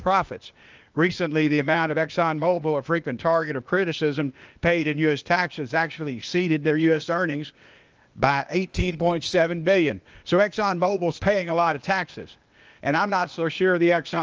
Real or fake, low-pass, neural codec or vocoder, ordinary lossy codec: fake; 7.2 kHz; codec, 16 kHz, 0.8 kbps, ZipCodec; Opus, 24 kbps